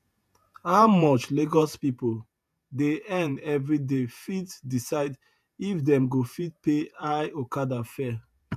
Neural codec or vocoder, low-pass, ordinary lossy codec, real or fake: vocoder, 48 kHz, 128 mel bands, Vocos; 14.4 kHz; MP3, 96 kbps; fake